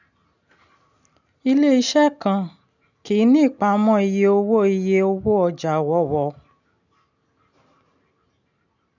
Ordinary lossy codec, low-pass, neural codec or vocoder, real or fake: none; 7.2 kHz; none; real